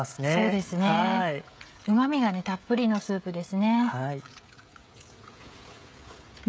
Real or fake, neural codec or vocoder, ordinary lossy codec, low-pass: fake; codec, 16 kHz, 16 kbps, FreqCodec, smaller model; none; none